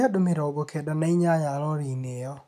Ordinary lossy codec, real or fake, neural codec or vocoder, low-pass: none; real; none; 14.4 kHz